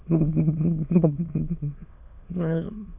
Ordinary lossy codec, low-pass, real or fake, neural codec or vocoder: MP3, 32 kbps; 3.6 kHz; fake; autoencoder, 22.05 kHz, a latent of 192 numbers a frame, VITS, trained on many speakers